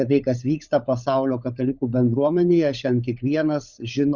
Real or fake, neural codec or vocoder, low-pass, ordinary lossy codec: fake; codec, 16 kHz, 16 kbps, FunCodec, trained on LibriTTS, 50 frames a second; 7.2 kHz; Opus, 64 kbps